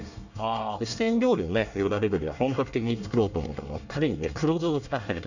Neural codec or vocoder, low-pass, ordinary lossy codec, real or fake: codec, 24 kHz, 1 kbps, SNAC; 7.2 kHz; none; fake